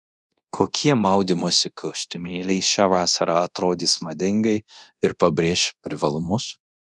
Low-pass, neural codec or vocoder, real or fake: 10.8 kHz; codec, 24 kHz, 0.9 kbps, DualCodec; fake